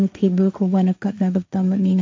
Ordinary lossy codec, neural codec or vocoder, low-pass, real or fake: none; codec, 16 kHz, 1.1 kbps, Voila-Tokenizer; none; fake